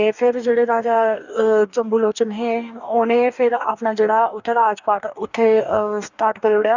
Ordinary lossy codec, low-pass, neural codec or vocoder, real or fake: none; 7.2 kHz; codec, 44.1 kHz, 2.6 kbps, DAC; fake